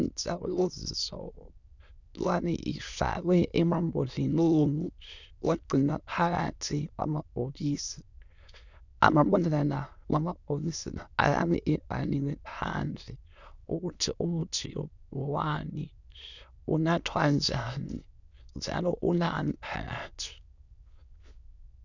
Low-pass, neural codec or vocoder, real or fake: 7.2 kHz; autoencoder, 22.05 kHz, a latent of 192 numbers a frame, VITS, trained on many speakers; fake